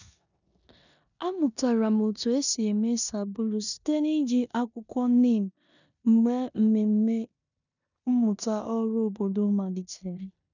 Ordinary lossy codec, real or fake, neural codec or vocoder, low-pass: none; fake; codec, 16 kHz in and 24 kHz out, 0.9 kbps, LongCat-Audio-Codec, four codebook decoder; 7.2 kHz